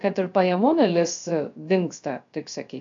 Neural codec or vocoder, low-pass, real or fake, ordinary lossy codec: codec, 16 kHz, 0.3 kbps, FocalCodec; 7.2 kHz; fake; AAC, 64 kbps